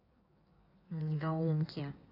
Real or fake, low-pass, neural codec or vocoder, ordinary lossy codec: fake; 5.4 kHz; codec, 16 kHz in and 24 kHz out, 1.1 kbps, FireRedTTS-2 codec; none